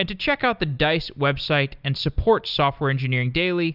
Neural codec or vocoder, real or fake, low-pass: none; real; 5.4 kHz